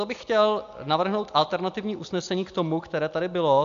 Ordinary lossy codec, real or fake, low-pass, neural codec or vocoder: AAC, 64 kbps; real; 7.2 kHz; none